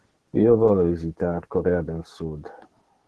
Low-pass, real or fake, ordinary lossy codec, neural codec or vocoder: 10.8 kHz; fake; Opus, 16 kbps; codec, 44.1 kHz, 7.8 kbps, DAC